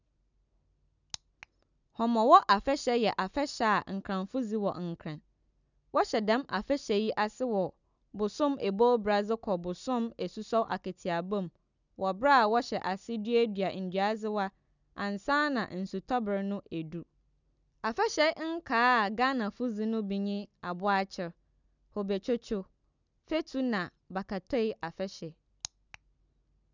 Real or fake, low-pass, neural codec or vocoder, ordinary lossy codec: real; 7.2 kHz; none; none